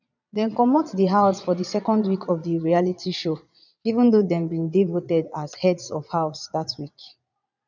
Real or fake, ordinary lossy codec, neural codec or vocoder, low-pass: fake; none; vocoder, 22.05 kHz, 80 mel bands, Vocos; 7.2 kHz